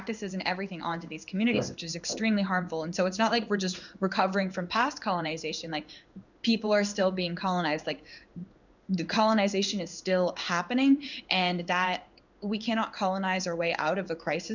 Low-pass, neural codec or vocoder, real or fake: 7.2 kHz; codec, 16 kHz in and 24 kHz out, 1 kbps, XY-Tokenizer; fake